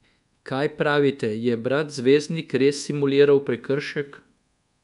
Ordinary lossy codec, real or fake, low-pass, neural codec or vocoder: none; fake; 10.8 kHz; codec, 24 kHz, 1.2 kbps, DualCodec